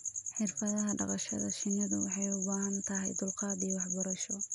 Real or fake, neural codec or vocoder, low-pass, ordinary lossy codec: real; none; 10.8 kHz; none